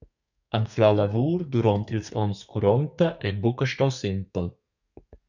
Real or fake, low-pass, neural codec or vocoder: fake; 7.2 kHz; codec, 32 kHz, 1.9 kbps, SNAC